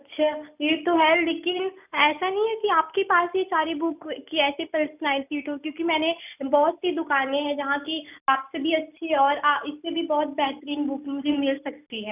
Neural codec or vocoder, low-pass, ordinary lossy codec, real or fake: none; 3.6 kHz; none; real